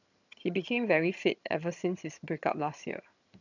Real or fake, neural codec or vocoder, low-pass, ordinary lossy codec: fake; vocoder, 22.05 kHz, 80 mel bands, HiFi-GAN; 7.2 kHz; none